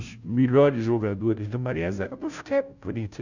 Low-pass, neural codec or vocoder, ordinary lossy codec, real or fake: 7.2 kHz; codec, 16 kHz, 0.5 kbps, FunCodec, trained on Chinese and English, 25 frames a second; none; fake